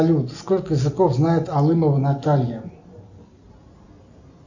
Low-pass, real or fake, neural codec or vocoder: 7.2 kHz; real; none